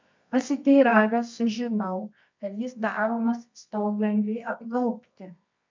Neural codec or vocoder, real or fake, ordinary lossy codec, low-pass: codec, 24 kHz, 0.9 kbps, WavTokenizer, medium music audio release; fake; MP3, 64 kbps; 7.2 kHz